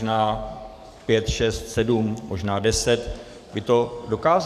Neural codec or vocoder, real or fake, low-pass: codec, 44.1 kHz, 7.8 kbps, DAC; fake; 14.4 kHz